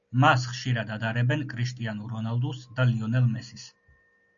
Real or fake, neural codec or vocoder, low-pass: real; none; 7.2 kHz